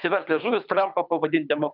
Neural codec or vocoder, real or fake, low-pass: codec, 24 kHz, 6 kbps, HILCodec; fake; 5.4 kHz